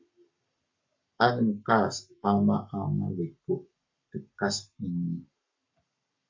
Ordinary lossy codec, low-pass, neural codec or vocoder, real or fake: MP3, 64 kbps; 7.2 kHz; codec, 44.1 kHz, 7.8 kbps, Pupu-Codec; fake